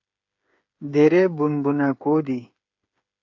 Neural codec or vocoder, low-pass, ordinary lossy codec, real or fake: codec, 16 kHz, 8 kbps, FreqCodec, smaller model; 7.2 kHz; AAC, 48 kbps; fake